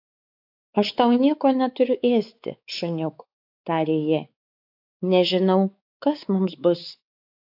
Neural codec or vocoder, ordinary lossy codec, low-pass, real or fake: codec, 16 kHz, 4 kbps, X-Codec, WavLM features, trained on Multilingual LibriSpeech; AAC, 48 kbps; 5.4 kHz; fake